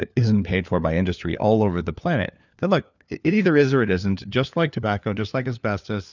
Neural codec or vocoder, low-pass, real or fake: codec, 16 kHz, 4 kbps, FunCodec, trained on LibriTTS, 50 frames a second; 7.2 kHz; fake